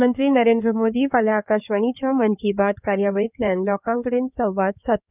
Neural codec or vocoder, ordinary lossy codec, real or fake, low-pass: codec, 16 kHz in and 24 kHz out, 2.2 kbps, FireRedTTS-2 codec; none; fake; 3.6 kHz